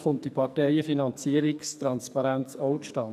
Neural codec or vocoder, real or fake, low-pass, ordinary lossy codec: codec, 44.1 kHz, 2.6 kbps, SNAC; fake; 14.4 kHz; none